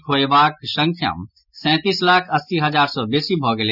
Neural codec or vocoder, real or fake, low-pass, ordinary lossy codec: none; real; 5.4 kHz; none